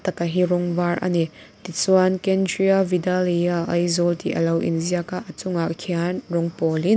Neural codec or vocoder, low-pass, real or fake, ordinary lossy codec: none; none; real; none